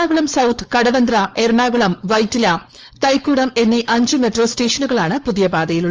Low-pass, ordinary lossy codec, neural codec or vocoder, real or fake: 7.2 kHz; Opus, 24 kbps; codec, 16 kHz, 4.8 kbps, FACodec; fake